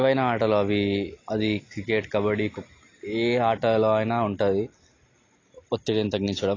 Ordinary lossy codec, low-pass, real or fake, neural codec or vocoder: AAC, 32 kbps; 7.2 kHz; real; none